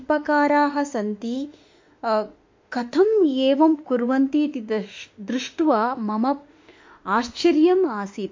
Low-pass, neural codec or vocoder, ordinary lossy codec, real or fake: 7.2 kHz; autoencoder, 48 kHz, 32 numbers a frame, DAC-VAE, trained on Japanese speech; MP3, 48 kbps; fake